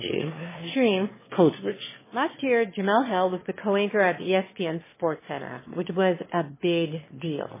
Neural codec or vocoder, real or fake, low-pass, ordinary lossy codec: autoencoder, 22.05 kHz, a latent of 192 numbers a frame, VITS, trained on one speaker; fake; 3.6 kHz; MP3, 16 kbps